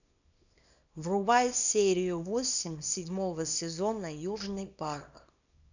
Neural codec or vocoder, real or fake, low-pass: codec, 24 kHz, 0.9 kbps, WavTokenizer, small release; fake; 7.2 kHz